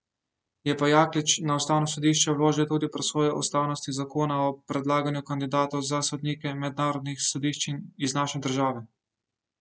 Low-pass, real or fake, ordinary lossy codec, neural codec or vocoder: none; real; none; none